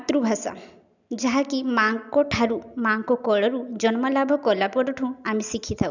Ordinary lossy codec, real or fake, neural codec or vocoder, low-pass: none; real; none; 7.2 kHz